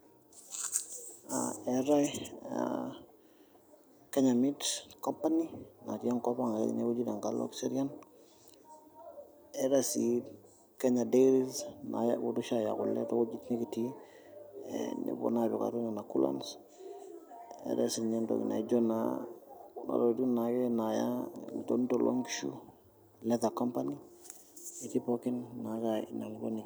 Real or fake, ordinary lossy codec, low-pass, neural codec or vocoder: real; none; none; none